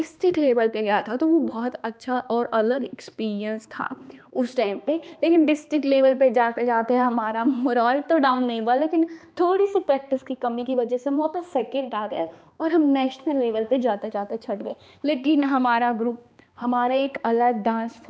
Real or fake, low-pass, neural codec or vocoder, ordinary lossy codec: fake; none; codec, 16 kHz, 2 kbps, X-Codec, HuBERT features, trained on balanced general audio; none